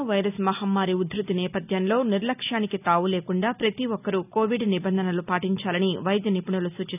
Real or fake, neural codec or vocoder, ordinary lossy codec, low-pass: real; none; none; 3.6 kHz